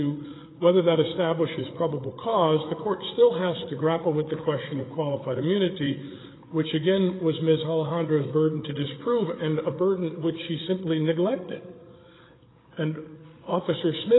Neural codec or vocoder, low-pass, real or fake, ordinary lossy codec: codec, 16 kHz, 8 kbps, FreqCodec, larger model; 7.2 kHz; fake; AAC, 16 kbps